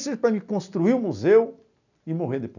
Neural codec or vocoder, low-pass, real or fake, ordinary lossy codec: none; 7.2 kHz; real; none